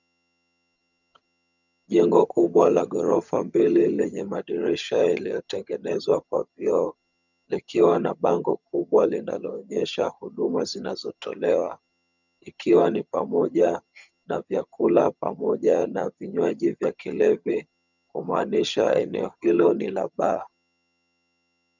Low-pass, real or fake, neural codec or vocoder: 7.2 kHz; fake; vocoder, 22.05 kHz, 80 mel bands, HiFi-GAN